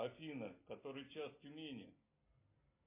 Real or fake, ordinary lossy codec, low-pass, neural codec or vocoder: real; MP3, 24 kbps; 3.6 kHz; none